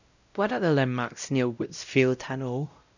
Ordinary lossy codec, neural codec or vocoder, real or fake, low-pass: none; codec, 16 kHz, 0.5 kbps, X-Codec, WavLM features, trained on Multilingual LibriSpeech; fake; 7.2 kHz